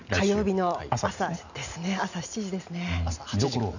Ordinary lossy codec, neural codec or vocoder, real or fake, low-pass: none; none; real; 7.2 kHz